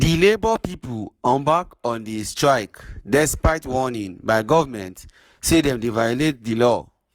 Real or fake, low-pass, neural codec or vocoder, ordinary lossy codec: fake; 19.8 kHz; codec, 44.1 kHz, 7.8 kbps, Pupu-Codec; Opus, 32 kbps